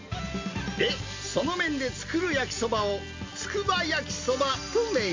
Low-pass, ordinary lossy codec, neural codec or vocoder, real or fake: 7.2 kHz; MP3, 64 kbps; none; real